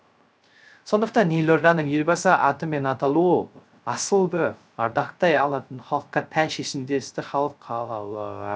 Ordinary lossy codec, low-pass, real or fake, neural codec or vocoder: none; none; fake; codec, 16 kHz, 0.3 kbps, FocalCodec